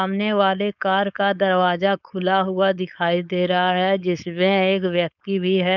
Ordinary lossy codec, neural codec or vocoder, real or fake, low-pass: none; codec, 16 kHz, 4.8 kbps, FACodec; fake; 7.2 kHz